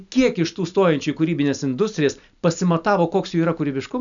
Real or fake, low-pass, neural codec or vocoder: real; 7.2 kHz; none